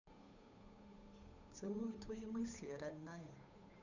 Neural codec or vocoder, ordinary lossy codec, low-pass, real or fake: codec, 16 kHz, 8 kbps, FunCodec, trained on Chinese and English, 25 frames a second; none; 7.2 kHz; fake